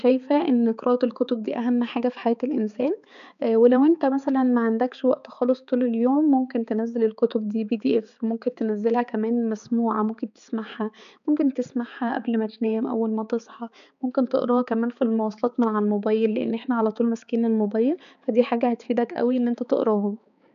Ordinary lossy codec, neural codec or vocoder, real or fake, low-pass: none; codec, 16 kHz, 4 kbps, X-Codec, HuBERT features, trained on balanced general audio; fake; 7.2 kHz